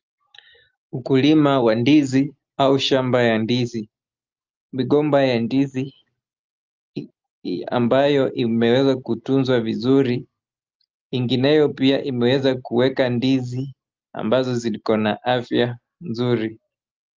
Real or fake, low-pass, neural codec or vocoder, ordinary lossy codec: real; 7.2 kHz; none; Opus, 24 kbps